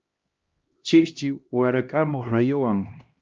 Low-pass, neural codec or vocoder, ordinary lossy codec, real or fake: 7.2 kHz; codec, 16 kHz, 1 kbps, X-Codec, HuBERT features, trained on LibriSpeech; Opus, 24 kbps; fake